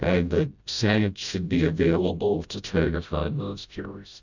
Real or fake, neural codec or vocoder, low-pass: fake; codec, 16 kHz, 0.5 kbps, FreqCodec, smaller model; 7.2 kHz